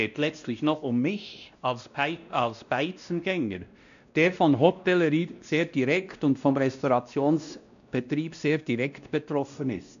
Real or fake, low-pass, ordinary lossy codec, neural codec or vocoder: fake; 7.2 kHz; none; codec, 16 kHz, 1 kbps, X-Codec, WavLM features, trained on Multilingual LibriSpeech